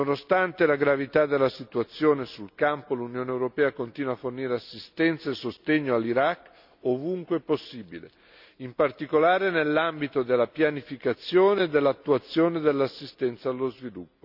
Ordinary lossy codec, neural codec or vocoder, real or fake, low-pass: none; none; real; 5.4 kHz